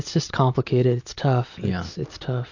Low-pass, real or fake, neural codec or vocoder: 7.2 kHz; real; none